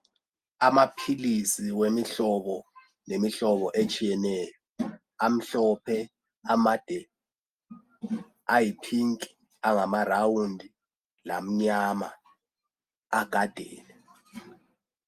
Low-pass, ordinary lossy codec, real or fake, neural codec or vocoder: 14.4 kHz; Opus, 32 kbps; real; none